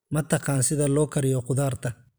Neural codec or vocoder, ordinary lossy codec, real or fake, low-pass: vocoder, 44.1 kHz, 128 mel bands every 256 samples, BigVGAN v2; none; fake; none